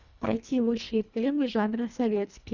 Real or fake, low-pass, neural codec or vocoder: fake; 7.2 kHz; codec, 24 kHz, 1.5 kbps, HILCodec